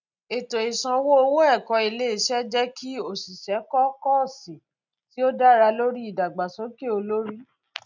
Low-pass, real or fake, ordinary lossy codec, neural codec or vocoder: 7.2 kHz; real; none; none